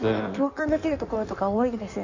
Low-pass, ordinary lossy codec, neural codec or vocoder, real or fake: 7.2 kHz; Opus, 64 kbps; codec, 16 kHz in and 24 kHz out, 1.1 kbps, FireRedTTS-2 codec; fake